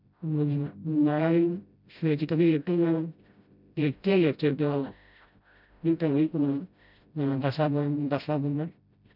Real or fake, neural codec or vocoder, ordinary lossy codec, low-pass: fake; codec, 16 kHz, 0.5 kbps, FreqCodec, smaller model; none; 5.4 kHz